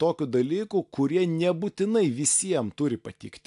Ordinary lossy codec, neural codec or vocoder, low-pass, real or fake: AAC, 64 kbps; none; 10.8 kHz; real